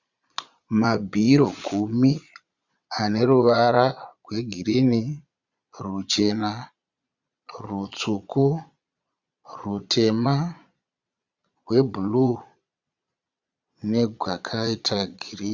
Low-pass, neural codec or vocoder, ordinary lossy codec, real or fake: 7.2 kHz; vocoder, 22.05 kHz, 80 mel bands, Vocos; Opus, 64 kbps; fake